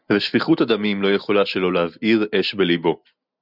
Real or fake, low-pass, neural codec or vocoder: real; 5.4 kHz; none